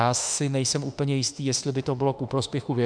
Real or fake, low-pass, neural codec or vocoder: fake; 9.9 kHz; autoencoder, 48 kHz, 32 numbers a frame, DAC-VAE, trained on Japanese speech